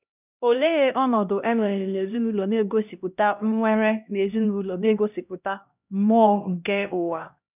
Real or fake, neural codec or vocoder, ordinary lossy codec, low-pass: fake; codec, 16 kHz, 1 kbps, X-Codec, HuBERT features, trained on LibriSpeech; none; 3.6 kHz